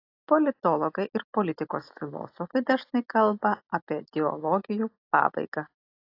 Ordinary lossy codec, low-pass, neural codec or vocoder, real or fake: AAC, 32 kbps; 5.4 kHz; none; real